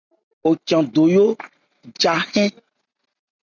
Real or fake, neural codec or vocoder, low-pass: real; none; 7.2 kHz